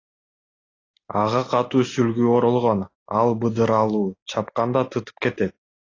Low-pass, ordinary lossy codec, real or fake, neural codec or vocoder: 7.2 kHz; AAC, 32 kbps; real; none